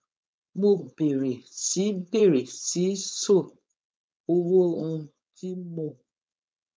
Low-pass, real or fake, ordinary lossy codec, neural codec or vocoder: none; fake; none; codec, 16 kHz, 4.8 kbps, FACodec